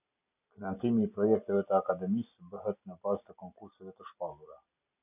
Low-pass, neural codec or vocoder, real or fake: 3.6 kHz; none; real